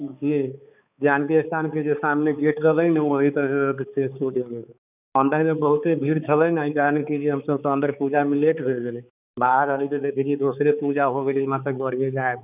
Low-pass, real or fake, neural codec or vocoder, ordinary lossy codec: 3.6 kHz; fake; codec, 16 kHz, 4 kbps, X-Codec, HuBERT features, trained on balanced general audio; none